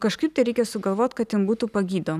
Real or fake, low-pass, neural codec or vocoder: real; 14.4 kHz; none